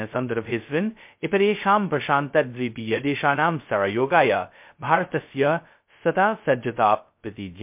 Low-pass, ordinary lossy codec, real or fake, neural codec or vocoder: 3.6 kHz; MP3, 32 kbps; fake; codec, 16 kHz, 0.2 kbps, FocalCodec